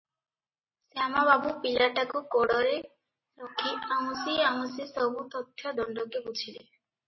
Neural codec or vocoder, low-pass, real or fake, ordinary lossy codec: none; 7.2 kHz; real; MP3, 24 kbps